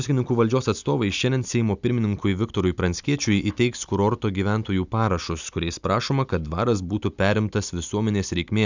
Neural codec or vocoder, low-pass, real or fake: none; 7.2 kHz; real